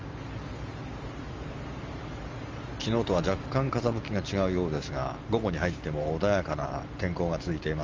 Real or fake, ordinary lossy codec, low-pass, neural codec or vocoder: fake; Opus, 32 kbps; 7.2 kHz; vocoder, 44.1 kHz, 128 mel bands every 512 samples, BigVGAN v2